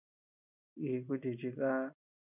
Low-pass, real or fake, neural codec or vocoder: 3.6 kHz; real; none